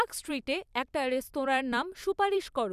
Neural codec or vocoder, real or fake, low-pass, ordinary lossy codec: vocoder, 44.1 kHz, 128 mel bands every 256 samples, BigVGAN v2; fake; 14.4 kHz; none